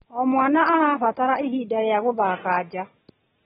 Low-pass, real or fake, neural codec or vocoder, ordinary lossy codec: 10.8 kHz; real; none; AAC, 16 kbps